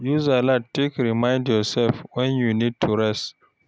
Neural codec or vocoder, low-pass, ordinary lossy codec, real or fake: none; none; none; real